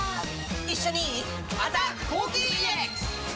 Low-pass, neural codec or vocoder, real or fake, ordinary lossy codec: none; none; real; none